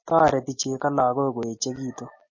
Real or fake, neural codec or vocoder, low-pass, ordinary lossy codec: real; none; 7.2 kHz; MP3, 32 kbps